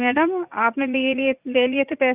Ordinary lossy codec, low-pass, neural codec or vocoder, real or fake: Opus, 64 kbps; 3.6 kHz; vocoder, 44.1 kHz, 80 mel bands, Vocos; fake